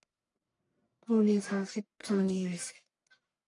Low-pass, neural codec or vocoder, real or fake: 10.8 kHz; codec, 44.1 kHz, 1.7 kbps, Pupu-Codec; fake